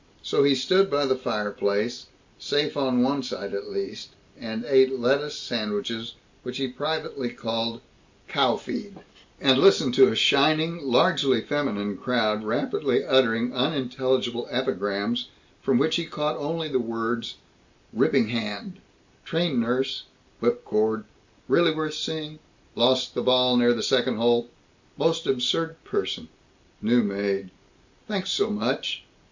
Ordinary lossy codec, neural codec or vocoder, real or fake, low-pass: MP3, 64 kbps; none; real; 7.2 kHz